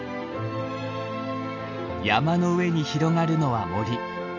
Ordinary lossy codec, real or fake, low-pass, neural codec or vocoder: none; real; 7.2 kHz; none